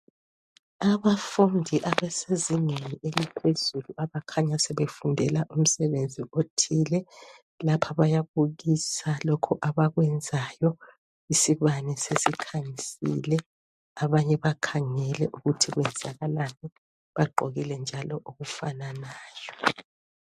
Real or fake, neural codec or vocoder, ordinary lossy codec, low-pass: real; none; MP3, 64 kbps; 14.4 kHz